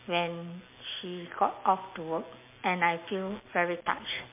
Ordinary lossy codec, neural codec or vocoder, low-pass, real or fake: MP3, 24 kbps; none; 3.6 kHz; real